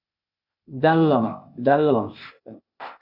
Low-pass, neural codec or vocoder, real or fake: 5.4 kHz; codec, 16 kHz, 0.8 kbps, ZipCodec; fake